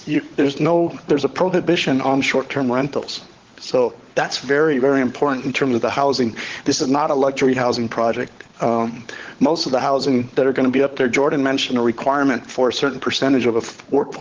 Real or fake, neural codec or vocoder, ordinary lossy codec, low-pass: fake; codec, 16 kHz, 16 kbps, FunCodec, trained on LibriTTS, 50 frames a second; Opus, 16 kbps; 7.2 kHz